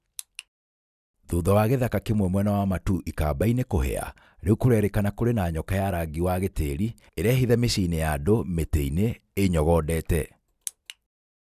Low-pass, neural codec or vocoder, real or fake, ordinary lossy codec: 14.4 kHz; none; real; none